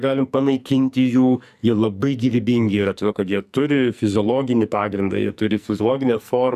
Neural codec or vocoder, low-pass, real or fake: codec, 44.1 kHz, 2.6 kbps, SNAC; 14.4 kHz; fake